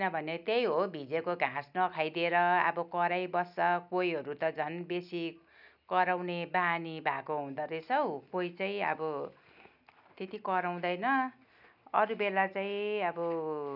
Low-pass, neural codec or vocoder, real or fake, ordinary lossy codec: 5.4 kHz; none; real; none